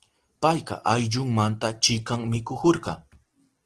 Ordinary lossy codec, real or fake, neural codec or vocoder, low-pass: Opus, 16 kbps; real; none; 10.8 kHz